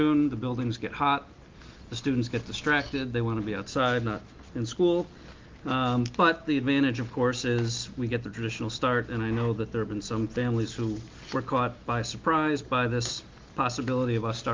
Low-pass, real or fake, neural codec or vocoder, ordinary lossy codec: 7.2 kHz; real; none; Opus, 32 kbps